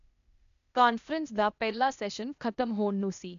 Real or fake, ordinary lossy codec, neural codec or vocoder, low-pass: fake; none; codec, 16 kHz, 0.8 kbps, ZipCodec; 7.2 kHz